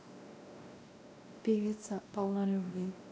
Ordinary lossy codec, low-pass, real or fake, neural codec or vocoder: none; none; fake; codec, 16 kHz, 1 kbps, X-Codec, WavLM features, trained on Multilingual LibriSpeech